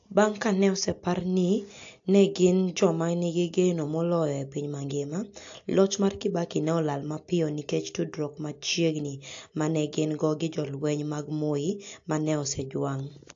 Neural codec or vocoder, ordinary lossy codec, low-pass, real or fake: none; AAC, 48 kbps; 7.2 kHz; real